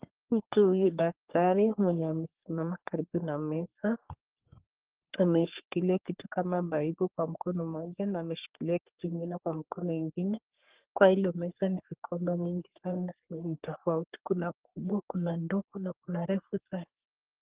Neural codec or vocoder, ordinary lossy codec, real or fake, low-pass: codec, 44.1 kHz, 3.4 kbps, Pupu-Codec; Opus, 16 kbps; fake; 3.6 kHz